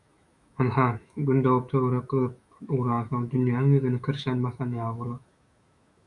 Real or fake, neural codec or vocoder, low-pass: fake; autoencoder, 48 kHz, 128 numbers a frame, DAC-VAE, trained on Japanese speech; 10.8 kHz